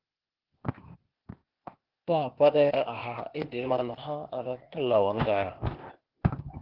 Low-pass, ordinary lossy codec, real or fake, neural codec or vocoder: 5.4 kHz; Opus, 16 kbps; fake; codec, 16 kHz, 0.8 kbps, ZipCodec